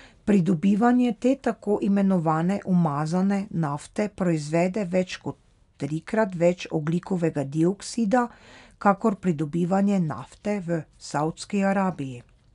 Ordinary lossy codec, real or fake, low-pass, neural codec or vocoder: none; real; 10.8 kHz; none